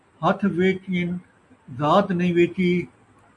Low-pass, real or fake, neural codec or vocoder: 10.8 kHz; real; none